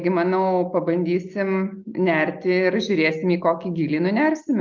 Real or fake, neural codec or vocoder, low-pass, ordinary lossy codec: real; none; 7.2 kHz; Opus, 32 kbps